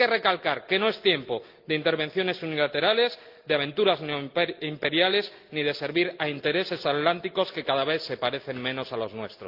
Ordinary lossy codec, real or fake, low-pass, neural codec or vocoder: Opus, 32 kbps; real; 5.4 kHz; none